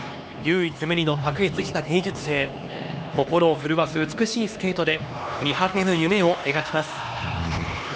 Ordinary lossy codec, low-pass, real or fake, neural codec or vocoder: none; none; fake; codec, 16 kHz, 2 kbps, X-Codec, HuBERT features, trained on LibriSpeech